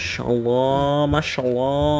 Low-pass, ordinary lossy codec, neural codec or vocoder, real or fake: none; none; codec, 16 kHz, 6 kbps, DAC; fake